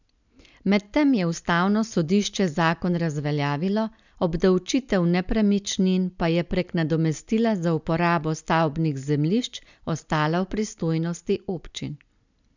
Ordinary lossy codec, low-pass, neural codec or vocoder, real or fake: none; 7.2 kHz; none; real